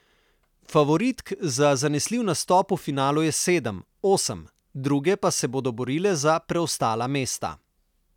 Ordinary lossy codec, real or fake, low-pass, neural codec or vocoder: none; real; 19.8 kHz; none